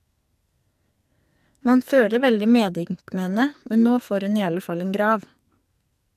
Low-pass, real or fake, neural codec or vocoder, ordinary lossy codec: 14.4 kHz; fake; codec, 44.1 kHz, 2.6 kbps, SNAC; MP3, 96 kbps